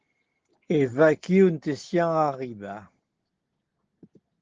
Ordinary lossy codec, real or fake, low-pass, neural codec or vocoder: Opus, 16 kbps; real; 7.2 kHz; none